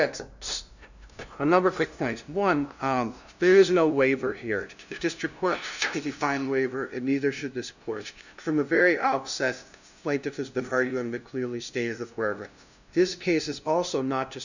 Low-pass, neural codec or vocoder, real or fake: 7.2 kHz; codec, 16 kHz, 0.5 kbps, FunCodec, trained on LibriTTS, 25 frames a second; fake